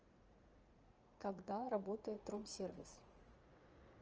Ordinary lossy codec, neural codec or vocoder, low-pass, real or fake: Opus, 24 kbps; vocoder, 44.1 kHz, 80 mel bands, Vocos; 7.2 kHz; fake